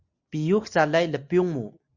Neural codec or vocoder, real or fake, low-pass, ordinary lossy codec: none; real; 7.2 kHz; Opus, 64 kbps